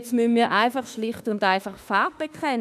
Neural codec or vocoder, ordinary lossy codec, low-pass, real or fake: autoencoder, 48 kHz, 32 numbers a frame, DAC-VAE, trained on Japanese speech; none; 14.4 kHz; fake